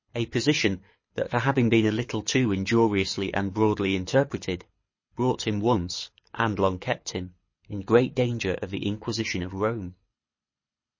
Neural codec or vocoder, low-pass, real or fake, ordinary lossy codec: codec, 24 kHz, 6 kbps, HILCodec; 7.2 kHz; fake; MP3, 32 kbps